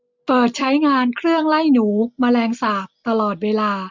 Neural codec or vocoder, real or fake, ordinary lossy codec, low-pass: none; real; MP3, 64 kbps; 7.2 kHz